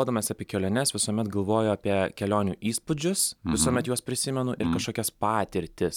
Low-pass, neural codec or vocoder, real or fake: 19.8 kHz; none; real